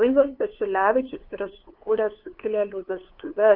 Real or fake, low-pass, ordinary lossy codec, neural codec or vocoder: fake; 5.4 kHz; Opus, 24 kbps; codec, 16 kHz, 2 kbps, FunCodec, trained on LibriTTS, 25 frames a second